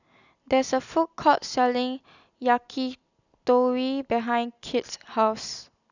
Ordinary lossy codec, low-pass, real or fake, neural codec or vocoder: none; 7.2 kHz; real; none